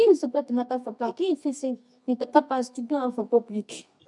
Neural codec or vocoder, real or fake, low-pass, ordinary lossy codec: codec, 24 kHz, 0.9 kbps, WavTokenizer, medium music audio release; fake; 10.8 kHz; MP3, 96 kbps